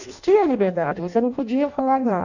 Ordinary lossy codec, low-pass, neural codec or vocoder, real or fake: none; 7.2 kHz; codec, 16 kHz in and 24 kHz out, 0.6 kbps, FireRedTTS-2 codec; fake